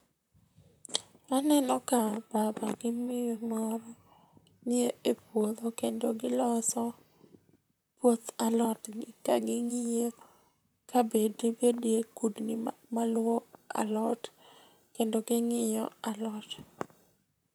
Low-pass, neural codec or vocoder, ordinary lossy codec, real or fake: none; vocoder, 44.1 kHz, 128 mel bands, Pupu-Vocoder; none; fake